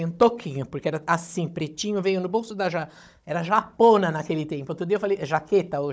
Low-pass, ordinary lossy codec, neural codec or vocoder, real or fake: none; none; codec, 16 kHz, 16 kbps, FunCodec, trained on Chinese and English, 50 frames a second; fake